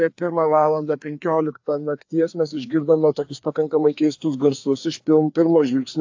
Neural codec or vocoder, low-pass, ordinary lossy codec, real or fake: codec, 16 kHz, 2 kbps, FreqCodec, larger model; 7.2 kHz; AAC, 48 kbps; fake